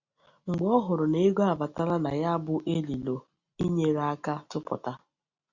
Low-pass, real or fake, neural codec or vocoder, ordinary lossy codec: 7.2 kHz; real; none; Opus, 64 kbps